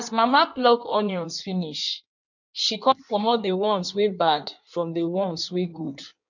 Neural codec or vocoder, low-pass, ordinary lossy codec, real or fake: codec, 16 kHz in and 24 kHz out, 1.1 kbps, FireRedTTS-2 codec; 7.2 kHz; none; fake